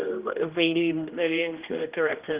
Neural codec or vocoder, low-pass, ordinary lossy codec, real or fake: codec, 16 kHz, 1 kbps, X-Codec, HuBERT features, trained on general audio; 3.6 kHz; Opus, 32 kbps; fake